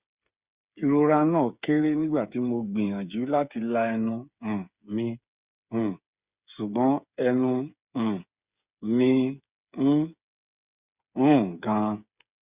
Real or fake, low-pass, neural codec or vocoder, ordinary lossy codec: fake; 3.6 kHz; codec, 16 kHz, 8 kbps, FreqCodec, smaller model; Opus, 64 kbps